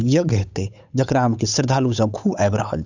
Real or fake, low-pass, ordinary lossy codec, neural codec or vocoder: fake; 7.2 kHz; none; codec, 16 kHz, 8 kbps, FunCodec, trained on Chinese and English, 25 frames a second